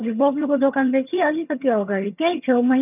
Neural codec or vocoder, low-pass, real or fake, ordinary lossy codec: vocoder, 22.05 kHz, 80 mel bands, HiFi-GAN; 3.6 kHz; fake; none